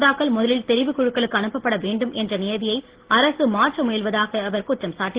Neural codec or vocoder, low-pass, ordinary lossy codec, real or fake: none; 3.6 kHz; Opus, 16 kbps; real